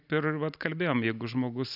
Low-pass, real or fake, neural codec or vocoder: 5.4 kHz; real; none